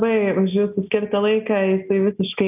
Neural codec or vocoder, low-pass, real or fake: none; 3.6 kHz; real